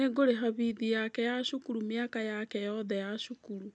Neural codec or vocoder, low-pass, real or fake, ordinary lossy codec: none; 9.9 kHz; real; none